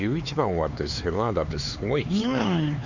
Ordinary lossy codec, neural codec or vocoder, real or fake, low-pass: none; codec, 16 kHz, 4 kbps, X-Codec, HuBERT features, trained on LibriSpeech; fake; 7.2 kHz